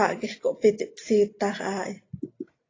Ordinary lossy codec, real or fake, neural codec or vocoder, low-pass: AAC, 32 kbps; real; none; 7.2 kHz